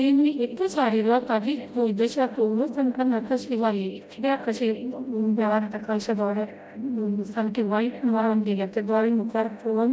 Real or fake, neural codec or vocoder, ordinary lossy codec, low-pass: fake; codec, 16 kHz, 0.5 kbps, FreqCodec, smaller model; none; none